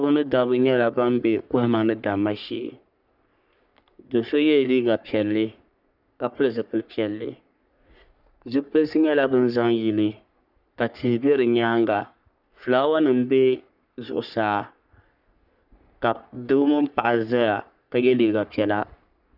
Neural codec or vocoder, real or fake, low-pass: codec, 44.1 kHz, 3.4 kbps, Pupu-Codec; fake; 5.4 kHz